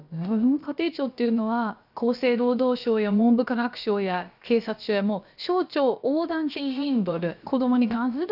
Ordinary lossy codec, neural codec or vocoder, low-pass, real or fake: none; codec, 16 kHz, about 1 kbps, DyCAST, with the encoder's durations; 5.4 kHz; fake